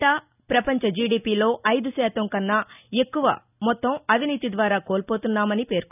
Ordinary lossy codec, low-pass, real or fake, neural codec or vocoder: none; 3.6 kHz; real; none